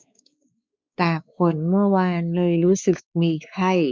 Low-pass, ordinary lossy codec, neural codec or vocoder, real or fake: none; none; codec, 16 kHz, 4 kbps, X-Codec, WavLM features, trained on Multilingual LibriSpeech; fake